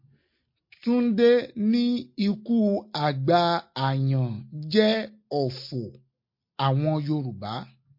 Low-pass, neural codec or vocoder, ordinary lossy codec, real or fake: 5.4 kHz; none; MP3, 32 kbps; real